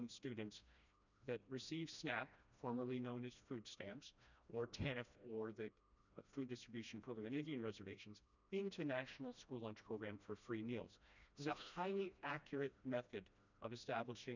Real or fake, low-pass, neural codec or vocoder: fake; 7.2 kHz; codec, 16 kHz, 1 kbps, FreqCodec, smaller model